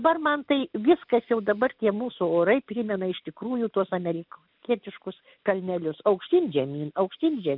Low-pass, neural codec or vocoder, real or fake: 5.4 kHz; none; real